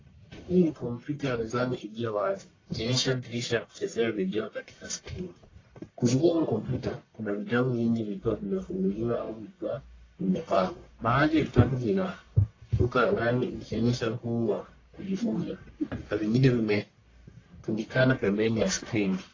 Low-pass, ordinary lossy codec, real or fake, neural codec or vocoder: 7.2 kHz; AAC, 32 kbps; fake; codec, 44.1 kHz, 1.7 kbps, Pupu-Codec